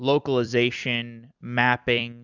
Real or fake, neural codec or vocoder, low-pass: fake; vocoder, 44.1 kHz, 128 mel bands every 256 samples, BigVGAN v2; 7.2 kHz